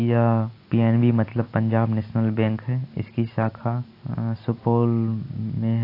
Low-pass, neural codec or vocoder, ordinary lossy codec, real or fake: 5.4 kHz; none; AAC, 32 kbps; real